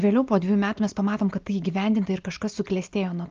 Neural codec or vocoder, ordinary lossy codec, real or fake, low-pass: none; Opus, 32 kbps; real; 7.2 kHz